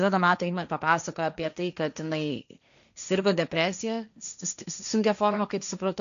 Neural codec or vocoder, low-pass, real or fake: codec, 16 kHz, 1.1 kbps, Voila-Tokenizer; 7.2 kHz; fake